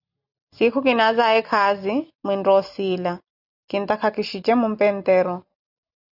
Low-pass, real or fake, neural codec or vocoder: 5.4 kHz; real; none